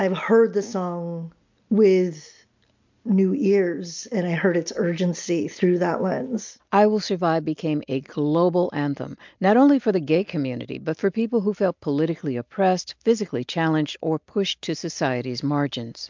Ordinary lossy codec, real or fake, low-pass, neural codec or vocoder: MP3, 64 kbps; real; 7.2 kHz; none